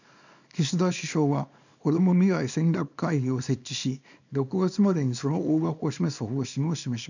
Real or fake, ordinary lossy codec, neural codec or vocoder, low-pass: fake; none; codec, 24 kHz, 0.9 kbps, WavTokenizer, small release; 7.2 kHz